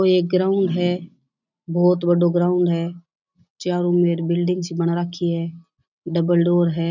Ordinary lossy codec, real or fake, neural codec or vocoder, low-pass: none; real; none; 7.2 kHz